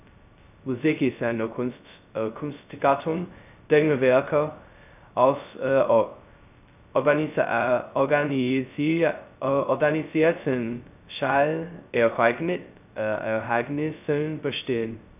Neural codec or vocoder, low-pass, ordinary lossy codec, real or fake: codec, 16 kHz, 0.2 kbps, FocalCodec; 3.6 kHz; none; fake